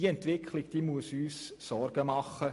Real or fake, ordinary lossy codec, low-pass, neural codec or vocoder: real; MP3, 48 kbps; 14.4 kHz; none